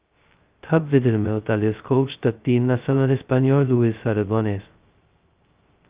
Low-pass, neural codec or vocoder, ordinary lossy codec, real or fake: 3.6 kHz; codec, 16 kHz, 0.2 kbps, FocalCodec; Opus, 32 kbps; fake